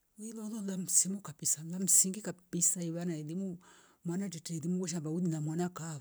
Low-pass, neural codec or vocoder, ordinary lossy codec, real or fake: none; none; none; real